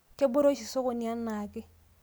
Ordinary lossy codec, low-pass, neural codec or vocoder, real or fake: none; none; none; real